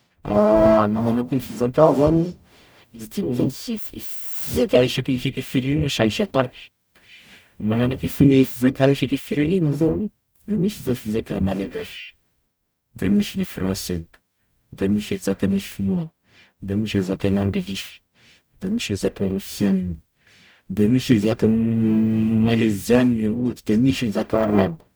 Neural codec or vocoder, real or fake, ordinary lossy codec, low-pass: codec, 44.1 kHz, 0.9 kbps, DAC; fake; none; none